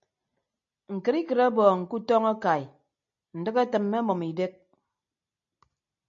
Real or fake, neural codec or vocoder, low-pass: real; none; 7.2 kHz